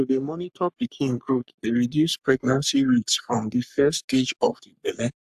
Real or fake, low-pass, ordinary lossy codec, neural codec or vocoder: fake; 14.4 kHz; AAC, 96 kbps; codec, 44.1 kHz, 3.4 kbps, Pupu-Codec